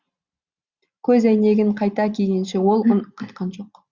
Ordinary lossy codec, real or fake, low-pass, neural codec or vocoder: Opus, 64 kbps; real; 7.2 kHz; none